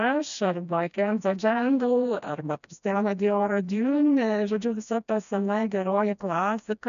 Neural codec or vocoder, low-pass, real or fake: codec, 16 kHz, 1 kbps, FreqCodec, smaller model; 7.2 kHz; fake